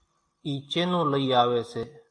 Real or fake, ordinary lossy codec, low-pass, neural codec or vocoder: real; AAC, 48 kbps; 9.9 kHz; none